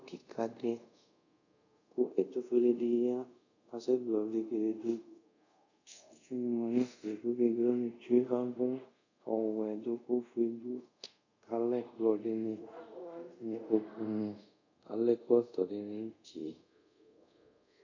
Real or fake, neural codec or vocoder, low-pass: fake; codec, 24 kHz, 0.5 kbps, DualCodec; 7.2 kHz